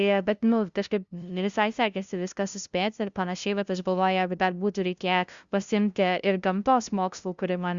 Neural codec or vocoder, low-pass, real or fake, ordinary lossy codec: codec, 16 kHz, 0.5 kbps, FunCodec, trained on LibriTTS, 25 frames a second; 7.2 kHz; fake; Opus, 64 kbps